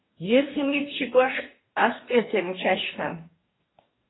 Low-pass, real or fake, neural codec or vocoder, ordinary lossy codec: 7.2 kHz; fake; codec, 44.1 kHz, 2.6 kbps, DAC; AAC, 16 kbps